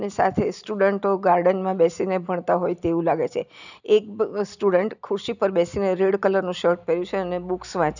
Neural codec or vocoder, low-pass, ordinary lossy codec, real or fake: none; 7.2 kHz; none; real